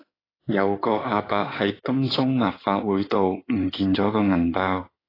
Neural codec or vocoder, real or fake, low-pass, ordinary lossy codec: codec, 16 kHz in and 24 kHz out, 2.2 kbps, FireRedTTS-2 codec; fake; 5.4 kHz; AAC, 24 kbps